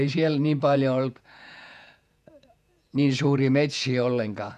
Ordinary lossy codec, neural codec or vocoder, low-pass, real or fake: none; vocoder, 48 kHz, 128 mel bands, Vocos; 14.4 kHz; fake